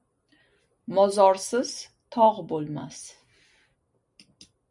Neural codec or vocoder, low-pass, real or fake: none; 10.8 kHz; real